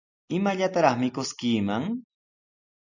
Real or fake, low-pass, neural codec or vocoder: real; 7.2 kHz; none